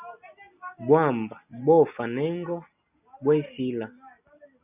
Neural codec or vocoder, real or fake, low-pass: none; real; 3.6 kHz